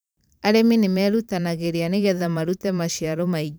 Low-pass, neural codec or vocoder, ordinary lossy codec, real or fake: none; vocoder, 44.1 kHz, 128 mel bands every 512 samples, BigVGAN v2; none; fake